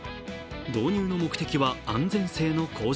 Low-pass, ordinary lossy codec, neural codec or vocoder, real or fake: none; none; none; real